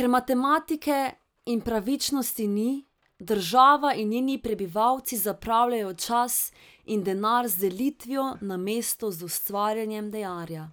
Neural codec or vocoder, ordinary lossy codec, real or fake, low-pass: none; none; real; none